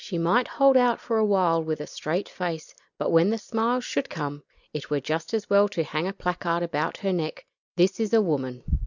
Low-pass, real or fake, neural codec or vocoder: 7.2 kHz; real; none